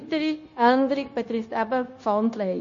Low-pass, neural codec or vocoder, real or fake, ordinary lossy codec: 7.2 kHz; codec, 16 kHz, 0.9 kbps, LongCat-Audio-Codec; fake; MP3, 32 kbps